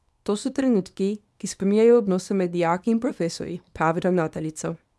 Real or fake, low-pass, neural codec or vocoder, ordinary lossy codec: fake; none; codec, 24 kHz, 0.9 kbps, WavTokenizer, small release; none